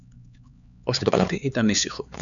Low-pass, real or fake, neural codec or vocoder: 7.2 kHz; fake; codec, 16 kHz, 4 kbps, X-Codec, HuBERT features, trained on LibriSpeech